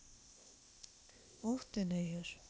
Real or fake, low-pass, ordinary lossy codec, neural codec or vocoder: fake; none; none; codec, 16 kHz, 0.8 kbps, ZipCodec